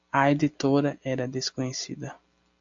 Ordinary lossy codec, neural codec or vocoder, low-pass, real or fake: AAC, 64 kbps; none; 7.2 kHz; real